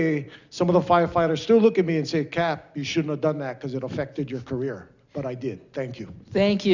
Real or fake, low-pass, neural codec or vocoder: real; 7.2 kHz; none